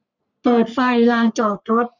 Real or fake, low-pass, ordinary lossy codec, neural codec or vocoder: fake; 7.2 kHz; none; codec, 44.1 kHz, 3.4 kbps, Pupu-Codec